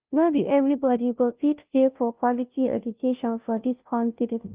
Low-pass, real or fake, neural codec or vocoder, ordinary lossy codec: 3.6 kHz; fake; codec, 16 kHz, 0.5 kbps, FunCodec, trained on Chinese and English, 25 frames a second; Opus, 32 kbps